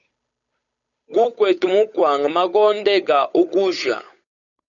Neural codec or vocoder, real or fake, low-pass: codec, 16 kHz, 8 kbps, FunCodec, trained on Chinese and English, 25 frames a second; fake; 7.2 kHz